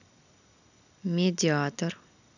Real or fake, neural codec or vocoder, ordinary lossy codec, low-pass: real; none; none; 7.2 kHz